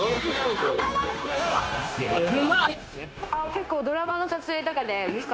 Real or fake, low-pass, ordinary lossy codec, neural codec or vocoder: fake; none; none; codec, 16 kHz, 0.9 kbps, LongCat-Audio-Codec